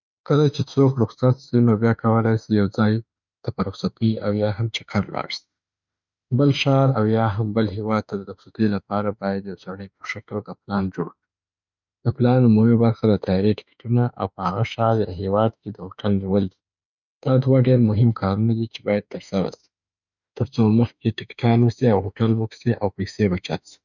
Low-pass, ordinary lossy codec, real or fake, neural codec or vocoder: 7.2 kHz; none; fake; autoencoder, 48 kHz, 32 numbers a frame, DAC-VAE, trained on Japanese speech